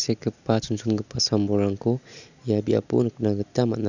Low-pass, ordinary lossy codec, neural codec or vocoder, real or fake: 7.2 kHz; none; none; real